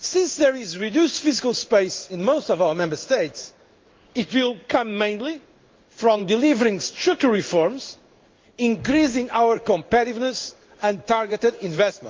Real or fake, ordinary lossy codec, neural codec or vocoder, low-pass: fake; Opus, 32 kbps; codec, 16 kHz in and 24 kHz out, 1 kbps, XY-Tokenizer; 7.2 kHz